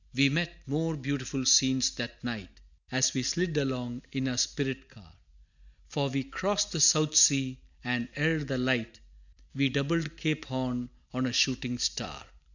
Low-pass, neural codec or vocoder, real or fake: 7.2 kHz; none; real